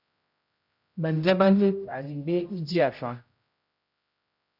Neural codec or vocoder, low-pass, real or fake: codec, 16 kHz, 0.5 kbps, X-Codec, HuBERT features, trained on general audio; 5.4 kHz; fake